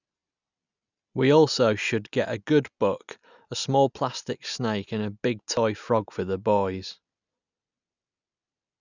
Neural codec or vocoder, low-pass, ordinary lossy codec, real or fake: none; 7.2 kHz; none; real